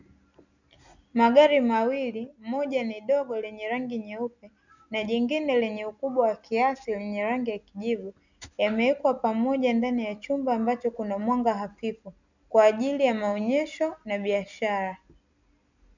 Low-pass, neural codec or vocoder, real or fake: 7.2 kHz; none; real